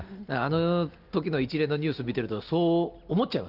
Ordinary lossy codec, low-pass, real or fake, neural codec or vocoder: Opus, 32 kbps; 5.4 kHz; real; none